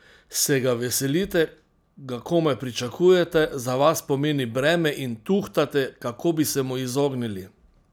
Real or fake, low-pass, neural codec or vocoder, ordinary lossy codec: real; none; none; none